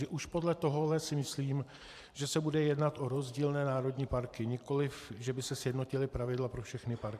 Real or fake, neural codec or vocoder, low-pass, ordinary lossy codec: fake; vocoder, 44.1 kHz, 128 mel bands every 512 samples, BigVGAN v2; 14.4 kHz; AAC, 96 kbps